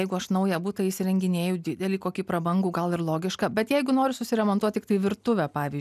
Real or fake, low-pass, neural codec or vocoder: real; 14.4 kHz; none